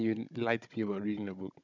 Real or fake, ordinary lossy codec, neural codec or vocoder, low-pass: fake; none; codec, 16 kHz, 16 kbps, FunCodec, trained on Chinese and English, 50 frames a second; 7.2 kHz